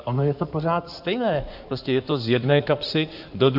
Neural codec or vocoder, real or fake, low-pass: codec, 16 kHz in and 24 kHz out, 2.2 kbps, FireRedTTS-2 codec; fake; 5.4 kHz